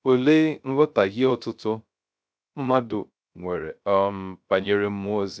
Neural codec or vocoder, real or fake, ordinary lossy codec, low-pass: codec, 16 kHz, 0.3 kbps, FocalCodec; fake; none; none